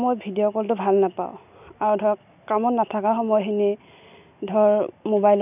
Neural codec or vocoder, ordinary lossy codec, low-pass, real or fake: none; none; 3.6 kHz; real